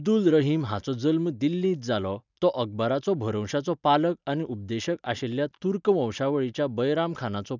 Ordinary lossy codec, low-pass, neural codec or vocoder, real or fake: none; 7.2 kHz; none; real